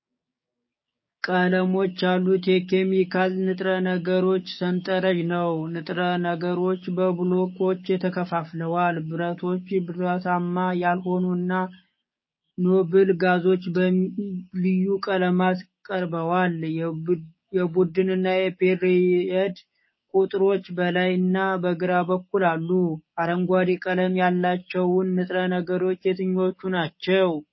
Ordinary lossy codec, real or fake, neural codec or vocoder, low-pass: MP3, 24 kbps; fake; codec, 16 kHz, 6 kbps, DAC; 7.2 kHz